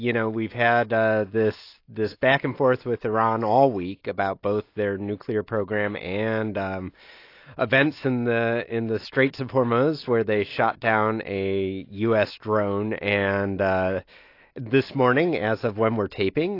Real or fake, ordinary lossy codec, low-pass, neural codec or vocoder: real; AAC, 32 kbps; 5.4 kHz; none